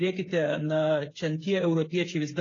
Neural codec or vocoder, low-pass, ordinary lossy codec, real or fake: codec, 16 kHz, 8 kbps, FreqCodec, smaller model; 7.2 kHz; AAC, 32 kbps; fake